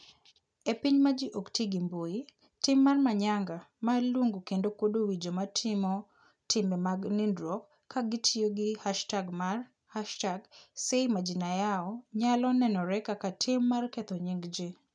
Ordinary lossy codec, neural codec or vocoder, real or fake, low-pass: none; none; real; 9.9 kHz